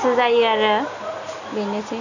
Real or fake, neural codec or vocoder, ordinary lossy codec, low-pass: real; none; none; 7.2 kHz